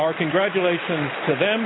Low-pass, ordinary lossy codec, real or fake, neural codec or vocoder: 7.2 kHz; AAC, 16 kbps; real; none